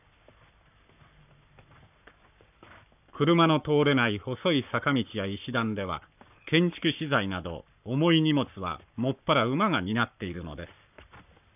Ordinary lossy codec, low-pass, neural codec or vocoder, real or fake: none; 3.6 kHz; codec, 44.1 kHz, 7.8 kbps, Pupu-Codec; fake